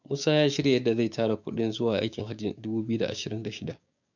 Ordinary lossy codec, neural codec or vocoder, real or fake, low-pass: none; codec, 16 kHz, 6 kbps, DAC; fake; 7.2 kHz